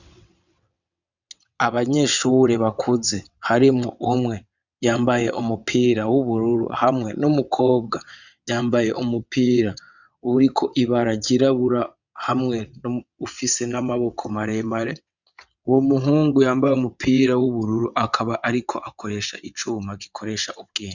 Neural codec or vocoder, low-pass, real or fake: vocoder, 22.05 kHz, 80 mel bands, WaveNeXt; 7.2 kHz; fake